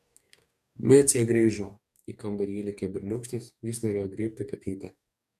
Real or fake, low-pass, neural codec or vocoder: fake; 14.4 kHz; codec, 44.1 kHz, 2.6 kbps, DAC